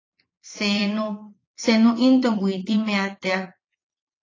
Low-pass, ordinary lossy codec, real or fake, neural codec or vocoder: 7.2 kHz; AAC, 32 kbps; fake; vocoder, 22.05 kHz, 80 mel bands, Vocos